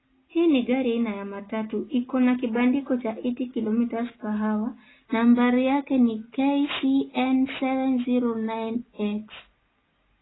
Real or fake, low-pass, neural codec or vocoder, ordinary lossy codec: real; 7.2 kHz; none; AAC, 16 kbps